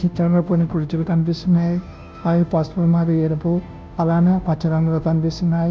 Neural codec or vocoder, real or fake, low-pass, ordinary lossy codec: codec, 16 kHz, 0.5 kbps, FunCodec, trained on Chinese and English, 25 frames a second; fake; none; none